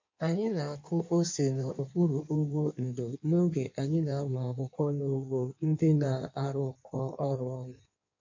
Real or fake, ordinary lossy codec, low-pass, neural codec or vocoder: fake; MP3, 48 kbps; 7.2 kHz; codec, 16 kHz in and 24 kHz out, 1.1 kbps, FireRedTTS-2 codec